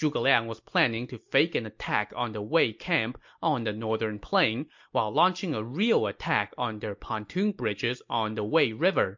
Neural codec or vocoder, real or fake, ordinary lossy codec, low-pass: none; real; MP3, 48 kbps; 7.2 kHz